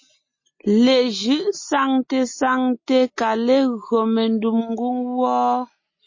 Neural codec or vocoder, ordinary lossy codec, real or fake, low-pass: none; MP3, 32 kbps; real; 7.2 kHz